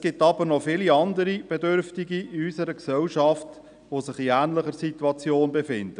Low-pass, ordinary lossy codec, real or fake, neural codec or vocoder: 9.9 kHz; none; real; none